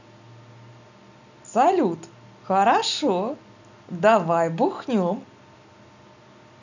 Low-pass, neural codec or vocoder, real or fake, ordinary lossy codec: 7.2 kHz; none; real; none